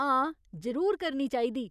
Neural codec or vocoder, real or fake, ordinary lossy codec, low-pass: none; real; none; 14.4 kHz